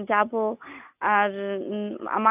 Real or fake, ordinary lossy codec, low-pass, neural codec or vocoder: real; AAC, 32 kbps; 3.6 kHz; none